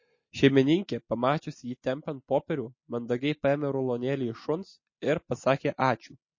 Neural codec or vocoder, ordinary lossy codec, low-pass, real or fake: none; MP3, 32 kbps; 7.2 kHz; real